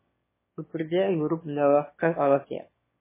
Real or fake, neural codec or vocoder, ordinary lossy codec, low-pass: fake; autoencoder, 22.05 kHz, a latent of 192 numbers a frame, VITS, trained on one speaker; MP3, 16 kbps; 3.6 kHz